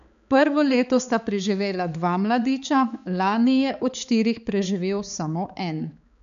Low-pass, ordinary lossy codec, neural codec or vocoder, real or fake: 7.2 kHz; none; codec, 16 kHz, 4 kbps, X-Codec, HuBERT features, trained on balanced general audio; fake